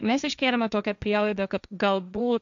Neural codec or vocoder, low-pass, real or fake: codec, 16 kHz, 1.1 kbps, Voila-Tokenizer; 7.2 kHz; fake